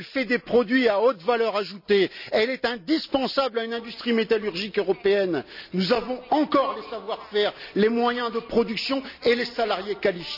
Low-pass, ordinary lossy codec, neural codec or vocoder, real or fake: 5.4 kHz; none; none; real